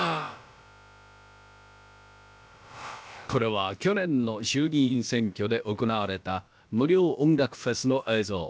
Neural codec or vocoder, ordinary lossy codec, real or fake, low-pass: codec, 16 kHz, about 1 kbps, DyCAST, with the encoder's durations; none; fake; none